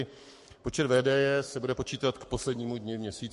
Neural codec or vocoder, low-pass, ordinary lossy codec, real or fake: codec, 44.1 kHz, 7.8 kbps, Pupu-Codec; 14.4 kHz; MP3, 48 kbps; fake